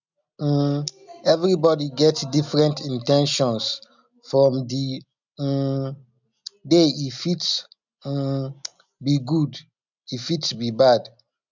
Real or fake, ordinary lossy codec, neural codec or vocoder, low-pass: real; none; none; 7.2 kHz